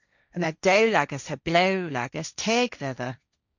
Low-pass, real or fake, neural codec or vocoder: 7.2 kHz; fake; codec, 16 kHz, 1.1 kbps, Voila-Tokenizer